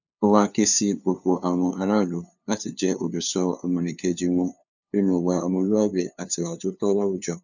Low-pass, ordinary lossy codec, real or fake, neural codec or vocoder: 7.2 kHz; none; fake; codec, 16 kHz, 2 kbps, FunCodec, trained on LibriTTS, 25 frames a second